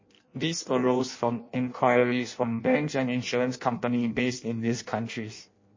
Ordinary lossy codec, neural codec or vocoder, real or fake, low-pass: MP3, 32 kbps; codec, 16 kHz in and 24 kHz out, 0.6 kbps, FireRedTTS-2 codec; fake; 7.2 kHz